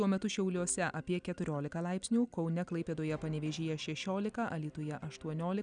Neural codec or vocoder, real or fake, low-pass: none; real; 9.9 kHz